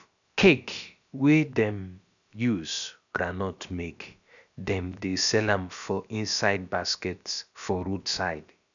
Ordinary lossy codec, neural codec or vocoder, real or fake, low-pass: none; codec, 16 kHz, about 1 kbps, DyCAST, with the encoder's durations; fake; 7.2 kHz